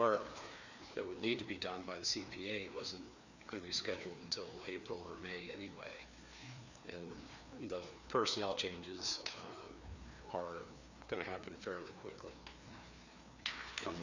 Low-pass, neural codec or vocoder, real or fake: 7.2 kHz; codec, 16 kHz, 2 kbps, FreqCodec, larger model; fake